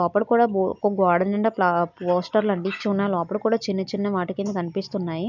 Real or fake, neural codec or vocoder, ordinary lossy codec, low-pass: real; none; none; 7.2 kHz